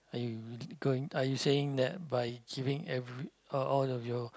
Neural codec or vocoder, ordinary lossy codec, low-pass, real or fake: none; none; none; real